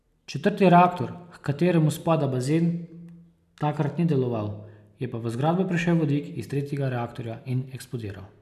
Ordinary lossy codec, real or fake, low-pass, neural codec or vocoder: none; real; 14.4 kHz; none